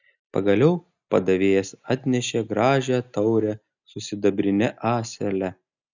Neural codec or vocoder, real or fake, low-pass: none; real; 7.2 kHz